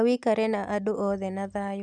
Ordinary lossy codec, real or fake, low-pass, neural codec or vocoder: none; real; none; none